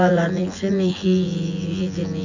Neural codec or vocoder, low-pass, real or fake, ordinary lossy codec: vocoder, 24 kHz, 100 mel bands, Vocos; 7.2 kHz; fake; none